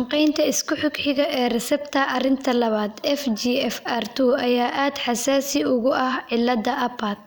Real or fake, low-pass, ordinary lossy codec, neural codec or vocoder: fake; none; none; vocoder, 44.1 kHz, 128 mel bands every 256 samples, BigVGAN v2